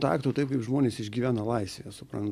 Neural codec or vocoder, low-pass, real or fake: none; 14.4 kHz; real